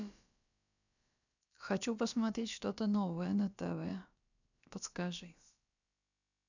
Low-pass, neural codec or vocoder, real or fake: 7.2 kHz; codec, 16 kHz, about 1 kbps, DyCAST, with the encoder's durations; fake